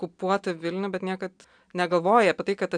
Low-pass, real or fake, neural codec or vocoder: 9.9 kHz; real; none